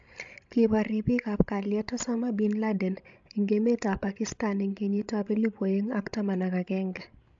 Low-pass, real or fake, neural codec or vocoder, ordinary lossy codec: 7.2 kHz; fake; codec, 16 kHz, 16 kbps, FreqCodec, larger model; AAC, 64 kbps